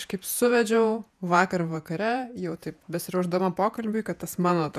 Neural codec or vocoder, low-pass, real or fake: vocoder, 48 kHz, 128 mel bands, Vocos; 14.4 kHz; fake